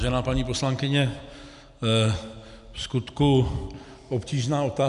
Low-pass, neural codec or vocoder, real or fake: 10.8 kHz; none; real